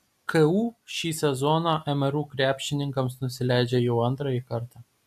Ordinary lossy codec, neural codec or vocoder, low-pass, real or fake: AAC, 96 kbps; none; 14.4 kHz; real